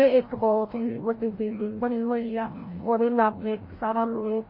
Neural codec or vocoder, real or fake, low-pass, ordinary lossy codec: codec, 16 kHz, 0.5 kbps, FreqCodec, larger model; fake; 5.4 kHz; MP3, 24 kbps